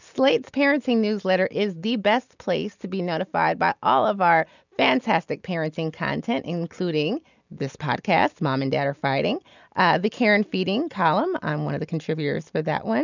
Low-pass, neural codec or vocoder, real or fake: 7.2 kHz; none; real